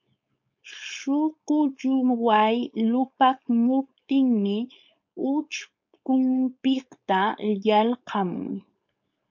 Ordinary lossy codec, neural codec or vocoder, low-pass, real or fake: MP3, 48 kbps; codec, 16 kHz, 4.8 kbps, FACodec; 7.2 kHz; fake